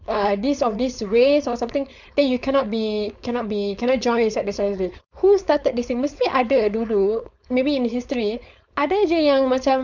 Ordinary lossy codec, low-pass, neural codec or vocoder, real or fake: none; 7.2 kHz; codec, 16 kHz, 4.8 kbps, FACodec; fake